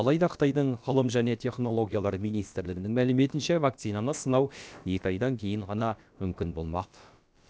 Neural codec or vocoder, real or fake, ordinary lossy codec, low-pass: codec, 16 kHz, about 1 kbps, DyCAST, with the encoder's durations; fake; none; none